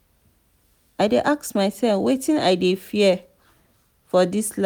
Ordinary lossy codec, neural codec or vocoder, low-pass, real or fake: none; none; none; real